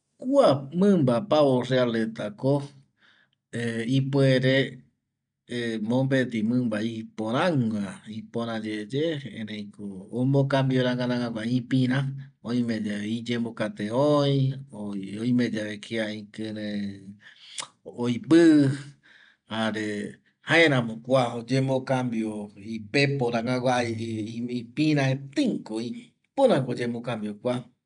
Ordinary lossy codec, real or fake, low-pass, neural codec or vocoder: none; real; 9.9 kHz; none